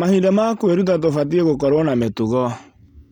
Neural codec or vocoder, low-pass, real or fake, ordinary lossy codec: none; 19.8 kHz; real; none